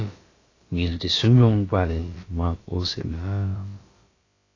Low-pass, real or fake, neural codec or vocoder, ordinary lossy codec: 7.2 kHz; fake; codec, 16 kHz, about 1 kbps, DyCAST, with the encoder's durations; AAC, 32 kbps